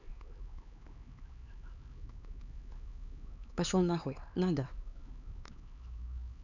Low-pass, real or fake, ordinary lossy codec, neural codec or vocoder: 7.2 kHz; fake; none; codec, 16 kHz, 4 kbps, X-Codec, HuBERT features, trained on LibriSpeech